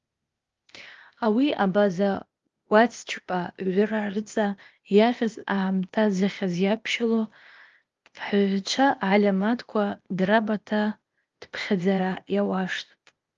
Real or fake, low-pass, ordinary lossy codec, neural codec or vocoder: fake; 7.2 kHz; Opus, 32 kbps; codec, 16 kHz, 0.8 kbps, ZipCodec